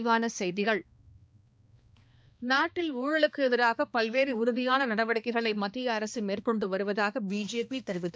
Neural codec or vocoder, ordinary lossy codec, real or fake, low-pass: codec, 16 kHz, 2 kbps, X-Codec, HuBERT features, trained on balanced general audio; none; fake; none